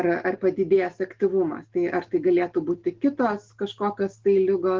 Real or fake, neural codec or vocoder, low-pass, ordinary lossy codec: real; none; 7.2 kHz; Opus, 16 kbps